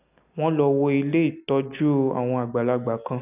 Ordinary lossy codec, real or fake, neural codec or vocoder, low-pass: none; real; none; 3.6 kHz